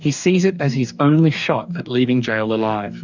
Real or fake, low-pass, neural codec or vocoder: fake; 7.2 kHz; codec, 44.1 kHz, 2.6 kbps, DAC